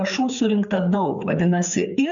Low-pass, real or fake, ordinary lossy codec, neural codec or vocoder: 7.2 kHz; fake; MP3, 96 kbps; codec, 16 kHz, 4 kbps, FreqCodec, larger model